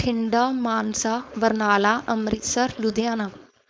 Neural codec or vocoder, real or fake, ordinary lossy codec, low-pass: codec, 16 kHz, 4.8 kbps, FACodec; fake; none; none